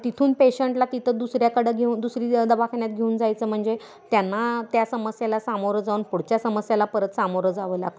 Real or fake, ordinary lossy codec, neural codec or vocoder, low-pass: real; none; none; none